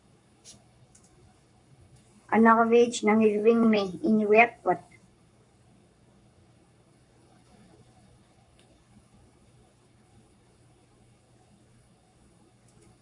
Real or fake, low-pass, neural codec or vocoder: fake; 10.8 kHz; codec, 44.1 kHz, 7.8 kbps, Pupu-Codec